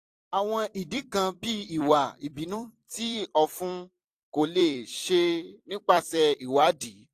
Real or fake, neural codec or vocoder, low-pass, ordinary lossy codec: fake; vocoder, 44.1 kHz, 128 mel bands every 256 samples, BigVGAN v2; 14.4 kHz; AAC, 64 kbps